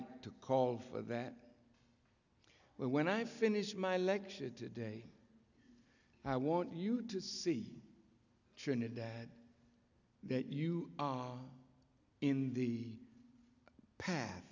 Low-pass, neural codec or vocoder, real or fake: 7.2 kHz; none; real